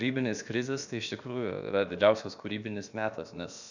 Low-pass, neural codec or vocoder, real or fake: 7.2 kHz; codec, 16 kHz, about 1 kbps, DyCAST, with the encoder's durations; fake